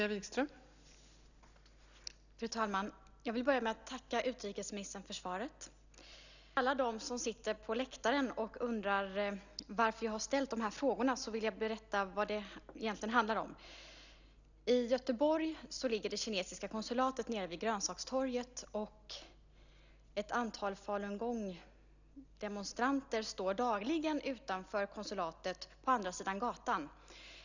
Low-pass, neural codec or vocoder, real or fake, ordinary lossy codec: 7.2 kHz; none; real; none